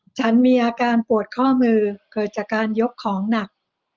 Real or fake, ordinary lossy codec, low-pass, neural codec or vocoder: real; Opus, 24 kbps; 7.2 kHz; none